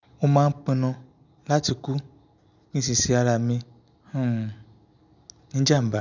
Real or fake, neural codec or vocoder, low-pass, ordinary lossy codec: real; none; 7.2 kHz; none